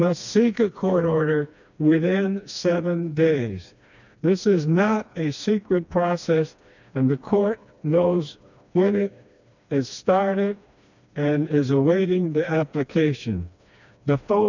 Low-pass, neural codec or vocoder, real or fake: 7.2 kHz; codec, 16 kHz, 2 kbps, FreqCodec, smaller model; fake